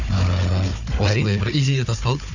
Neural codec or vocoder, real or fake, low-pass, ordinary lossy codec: codec, 16 kHz, 4 kbps, FunCodec, trained on Chinese and English, 50 frames a second; fake; 7.2 kHz; none